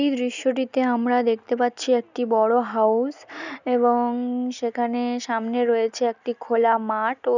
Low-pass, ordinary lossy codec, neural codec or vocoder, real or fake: 7.2 kHz; none; none; real